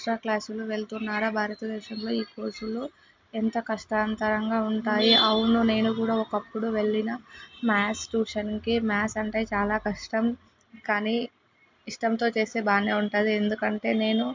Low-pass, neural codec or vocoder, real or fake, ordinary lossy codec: 7.2 kHz; none; real; MP3, 64 kbps